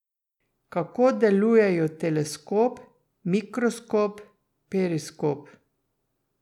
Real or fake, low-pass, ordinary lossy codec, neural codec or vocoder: real; 19.8 kHz; none; none